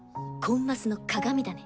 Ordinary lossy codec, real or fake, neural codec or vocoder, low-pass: none; real; none; none